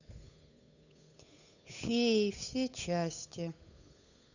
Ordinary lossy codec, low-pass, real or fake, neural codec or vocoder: none; 7.2 kHz; fake; codec, 16 kHz, 8 kbps, FunCodec, trained on Chinese and English, 25 frames a second